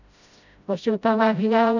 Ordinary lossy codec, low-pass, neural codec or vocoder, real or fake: none; 7.2 kHz; codec, 16 kHz, 0.5 kbps, FreqCodec, smaller model; fake